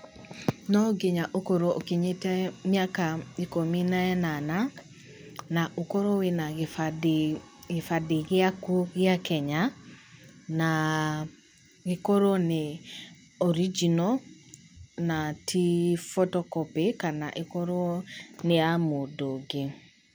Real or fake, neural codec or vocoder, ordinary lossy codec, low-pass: real; none; none; none